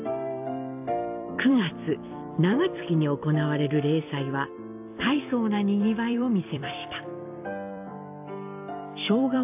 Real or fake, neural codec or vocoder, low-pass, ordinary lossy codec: fake; vocoder, 44.1 kHz, 128 mel bands every 256 samples, BigVGAN v2; 3.6 kHz; AAC, 32 kbps